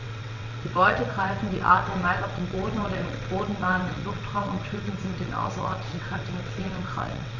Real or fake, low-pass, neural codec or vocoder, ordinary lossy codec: fake; 7.2 kHz; vocoder, 22.05 kHz, 80 mel bands, WaveNeXt; none